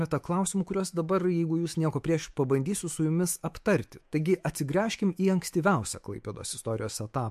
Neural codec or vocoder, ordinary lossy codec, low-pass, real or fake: autoencoder, 48 kHz, 128 numbers a frame, DAC-VAE, trained on Japanese speech; MP3, 64 kbps; 14.4 kHz; fake